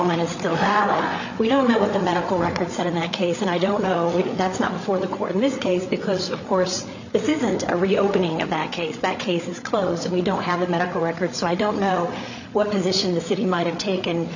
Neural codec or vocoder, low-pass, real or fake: codec, 16 kHz, 8 kbps, FreqCodec, larger model; 7.2 kHz; fake